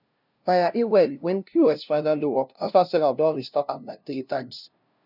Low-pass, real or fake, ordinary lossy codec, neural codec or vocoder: 5.4 kHz; fake; none; codec, 16 kHz, 0.5 kbps, FunCodec, trained on LibriTTS, 25 frames a second